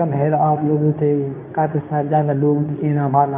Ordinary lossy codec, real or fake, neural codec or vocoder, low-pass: none; fake; codec, 24 kHz, 0.9 kbps, WavTokenizer, medium speech release version 2; 3.6 kHz